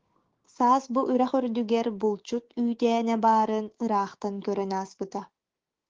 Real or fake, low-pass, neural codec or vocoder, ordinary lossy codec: fake; 7.2 kHz; codec, 16 kHz, 6 kbps, DAC; Opus, 16 kbps